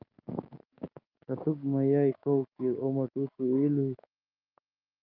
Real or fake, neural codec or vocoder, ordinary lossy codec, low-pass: fake; codec, 16 kHz, 6 kbps, DAC; Opus, 32 kbps; 5.4 kHz